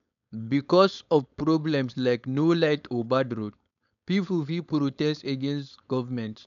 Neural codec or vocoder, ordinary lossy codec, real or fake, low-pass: codec, 16 kHz, 4.8 kbps, FACodec; none; fake; 7.2 kHz